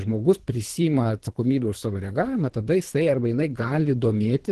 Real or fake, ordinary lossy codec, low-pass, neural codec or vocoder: fake; Opus, 16 kbps; 10.8 kHz; codec, 24 kHz, 3 kbps, HILCodec